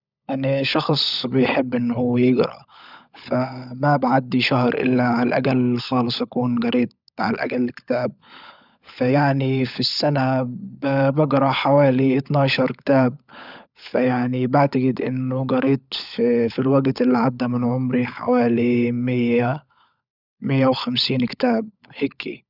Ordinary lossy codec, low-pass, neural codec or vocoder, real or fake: none; 5.4 kHz; codec, 16 kHz, 16 kbps, FunCodec, trained on LibriTTS, 50 frames a second; fake